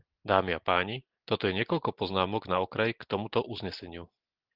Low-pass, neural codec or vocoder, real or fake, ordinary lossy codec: 5.4 kHz; none; real; Opus, 16 kbps